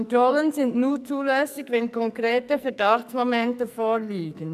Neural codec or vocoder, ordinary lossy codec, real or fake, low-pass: codec, 44.1 kHz, 2.6 kbps, SNAC; none; fake; 14.4 kHz